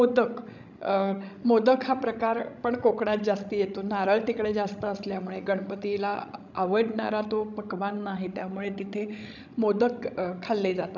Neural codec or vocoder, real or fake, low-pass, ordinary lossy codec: codec, 16 kHz, 16 kbps, FreqCodec, larger model; fake; 7.2 kHz; none